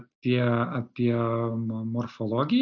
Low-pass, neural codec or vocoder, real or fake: 7.2 kHz; none; real